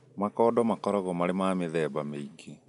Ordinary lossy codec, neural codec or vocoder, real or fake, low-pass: none; none; real; 10.8 kHz